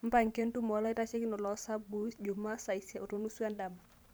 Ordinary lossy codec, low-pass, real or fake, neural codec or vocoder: none; none; fake; vocoder, 44.1 kHz, 128 mel bands every 512 samples, BigVGAN v2